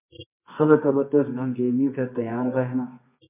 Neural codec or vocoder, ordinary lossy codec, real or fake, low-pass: codec, 24 kHz, 0.9 kbps, WavTokenizer, medium music audio release; MP3, 24 kbps; fake; 3.6 kHz